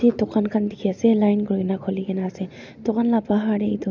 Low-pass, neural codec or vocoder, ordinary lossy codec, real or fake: 7.2 kHz; none; none; real